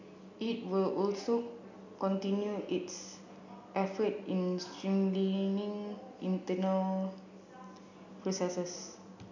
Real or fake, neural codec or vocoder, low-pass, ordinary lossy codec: real; none; 7.2 kHz; none